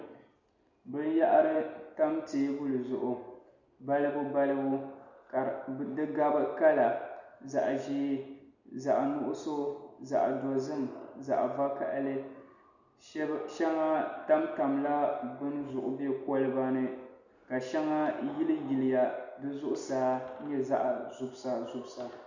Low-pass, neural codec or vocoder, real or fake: 7.2 kHz; none; real